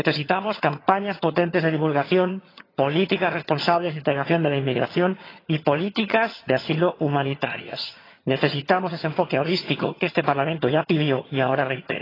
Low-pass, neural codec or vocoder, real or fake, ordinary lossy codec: 5.4 kHz; vocoder, 22.05 kHz, 80 mel bands, HiFi-GAN; fake; AAC, 24 kbps